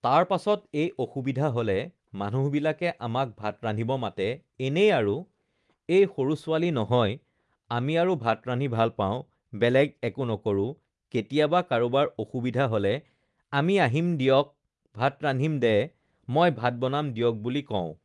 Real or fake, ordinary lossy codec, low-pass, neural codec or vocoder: real; Opus, 32 kbps; 10.8 kHz; none